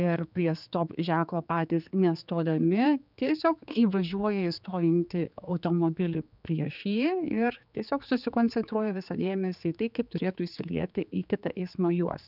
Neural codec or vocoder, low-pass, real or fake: codec, 16 kHz, 4 kbps, X-Codec, HuBERT features, trained on general audio; 5.4 kHz; fake